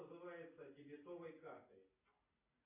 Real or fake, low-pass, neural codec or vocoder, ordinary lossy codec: real; 3.6 kHz; none; AAC, 32 kbps